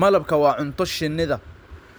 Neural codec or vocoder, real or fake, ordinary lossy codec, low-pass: none; real; none; none